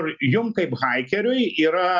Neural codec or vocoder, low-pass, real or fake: none; 7.2 kHz; real